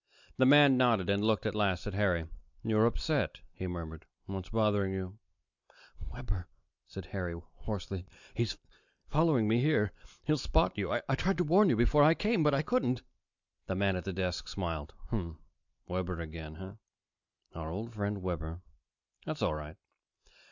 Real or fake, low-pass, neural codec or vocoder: real; 7.2 kHz; none